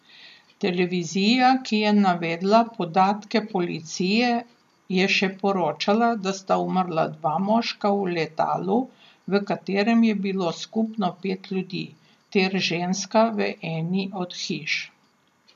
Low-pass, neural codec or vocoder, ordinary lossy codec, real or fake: 14.4 kHz; none; MP3, 96 kbps; real